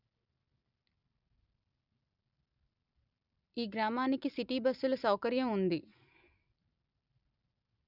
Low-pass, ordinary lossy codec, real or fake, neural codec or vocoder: 5.4 kHz; none; real; none